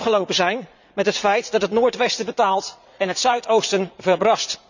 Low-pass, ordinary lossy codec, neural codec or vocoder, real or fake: 7.2 kHz; none; vocoder, 44.1 kHz, 80 mel bands, Vocos; fake